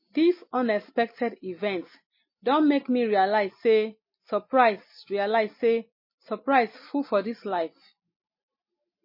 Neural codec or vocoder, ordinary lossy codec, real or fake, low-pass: none; MP3, 24 kbps; real; 5.4 kHz